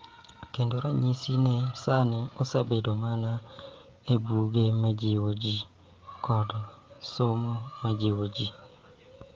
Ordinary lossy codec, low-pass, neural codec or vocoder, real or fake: Opus, 32 kbps; 7.2 kHz; codec, 16 kHz, 16 kbps, FreqCodec, smaller model; fake